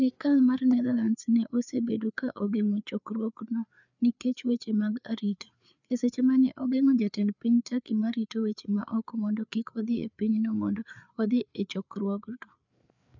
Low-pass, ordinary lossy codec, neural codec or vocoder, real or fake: 7.2 kHz; none; codec, 16 kHz, 4 kbps, FreqCodec, larger model; fake